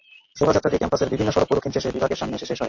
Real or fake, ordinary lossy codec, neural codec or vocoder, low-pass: real; MP3, 32 kbps; none; 7.2 kHz